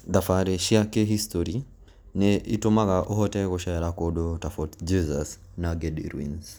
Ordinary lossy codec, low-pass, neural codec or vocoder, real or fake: none; none; none; real